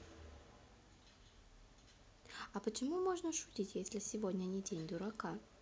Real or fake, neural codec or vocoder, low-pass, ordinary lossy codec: real; none; none; none